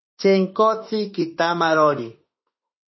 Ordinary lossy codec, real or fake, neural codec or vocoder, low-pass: MP3, 24 kbps; fake; autoencoder, 48 kHz, 32 numbers a frame, DAC-VAE, trained on Japanese speech; 7.2 kHz